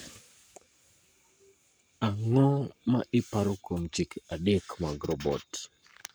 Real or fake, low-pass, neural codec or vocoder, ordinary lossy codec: fake; none; codec, 44.1 kHz, 7.8 kbps, Pupu-Codec; none